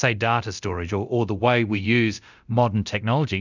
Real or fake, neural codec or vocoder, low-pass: fake; codec, 24 kHz, 0.9 kbps, DualCodec; 7.2 kHz